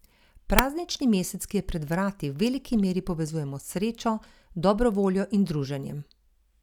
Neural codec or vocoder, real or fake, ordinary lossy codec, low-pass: none; real; none; 19.8 kHz